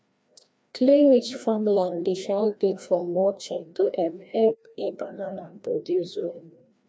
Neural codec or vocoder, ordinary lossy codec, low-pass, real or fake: codec, 16 kHz, 1 kbps, FreqCodec, larger model; none; none; fake